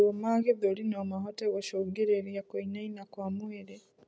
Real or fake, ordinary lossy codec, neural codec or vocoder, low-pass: real; none; none; none